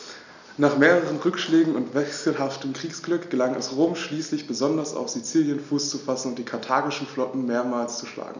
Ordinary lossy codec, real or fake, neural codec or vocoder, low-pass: none; real; none; 7.2 kHz